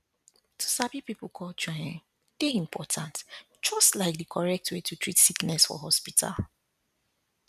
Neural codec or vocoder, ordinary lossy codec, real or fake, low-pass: none; none; real; 14.4 kHz